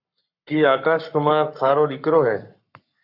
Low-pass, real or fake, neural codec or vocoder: 5.4 kHz; fake; codec, 44.1 kHz, 7.8 kbps, Pupu-Codec